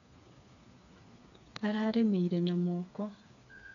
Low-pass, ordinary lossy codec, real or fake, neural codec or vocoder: 7.2 kHz; none; fake; codec, 16 kHz, 4 kbps, FreqCodec, smaller model